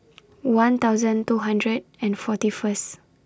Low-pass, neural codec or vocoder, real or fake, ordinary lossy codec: none; none; real; none